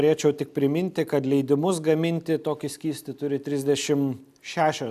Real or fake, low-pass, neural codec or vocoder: real; 14.4 kHz; none